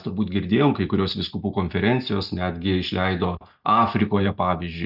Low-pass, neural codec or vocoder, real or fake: 5.4 kHz; none; real